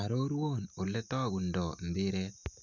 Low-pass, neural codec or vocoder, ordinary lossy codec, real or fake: 7.2 kHz; none; none; real